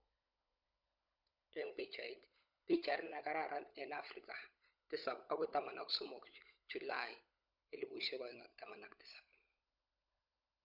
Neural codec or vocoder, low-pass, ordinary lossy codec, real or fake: codec, 16 kHz, 16 kbps, FunCodec, trained on LibriTTS, 50 frames a second; 5.4 kHz; none; fake